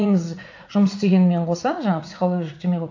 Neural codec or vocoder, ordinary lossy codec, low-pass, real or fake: vocoder, 44.1 kHz, 80 mel bands, Vocos; AAC, 48 kbps; 7.2 kHz; fake